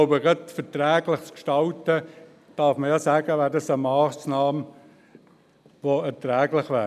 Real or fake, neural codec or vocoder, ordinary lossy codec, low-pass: real; none; none; 14.4 kHz